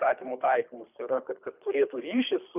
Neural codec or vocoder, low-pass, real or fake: codec, 24 kHz, 3 kbps, HILCodec; 3.6 kHz; fake